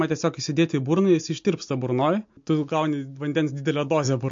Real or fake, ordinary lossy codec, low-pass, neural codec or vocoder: real; MP3, 48 kbps; 7.2 kHz; none